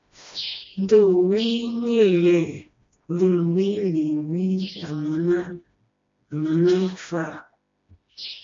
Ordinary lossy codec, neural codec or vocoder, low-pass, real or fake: MP3, 48 kbps; codec, 16 kHz, 1 kbps, FreqCodec, smaller model; 7.2 kHz; fake